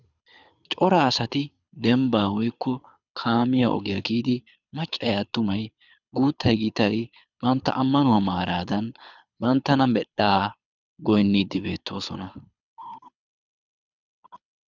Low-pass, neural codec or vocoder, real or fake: 7.2 kHz; codec, 24 kHz, 6 kbps, HILCodec; fake